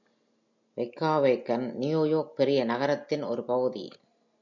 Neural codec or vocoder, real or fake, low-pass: none; real; 7.2 kHz